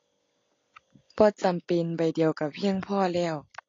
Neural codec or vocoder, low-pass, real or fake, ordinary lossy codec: none; 7.2 kHz; real; AAC, 32 kbps